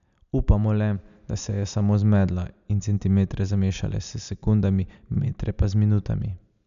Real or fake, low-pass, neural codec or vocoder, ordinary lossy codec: real; 7.2 kHz; none; none